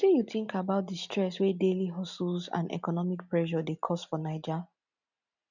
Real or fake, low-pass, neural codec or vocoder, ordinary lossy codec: real; 7.2 kHz; none; none